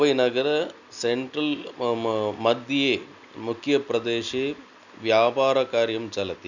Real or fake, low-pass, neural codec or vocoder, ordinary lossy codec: real; 7.2 kHz; none; none